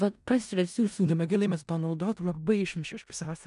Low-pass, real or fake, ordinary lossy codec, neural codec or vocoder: 10.8 kHz; fake; MP3, 96 kbps; codec, 16 kHz in and 24 kHz out, 0.4 kbps, LongCat-Audio-Codec, four codebook decoder